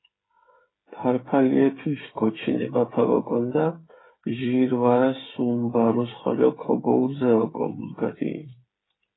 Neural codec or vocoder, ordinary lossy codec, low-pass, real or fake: codec, 16 kHz, 8 kbps, FreqCodec, smaller model; AAC, 16 kbps; 7.2 kHz; fake